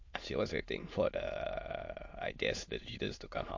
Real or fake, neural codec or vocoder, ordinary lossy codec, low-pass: fake; autoencoder, 22.05 kHz, a latent of 192 numbers a frame, VITS, trained on many speakers; MP3, 48 kbps; 7.2 kHz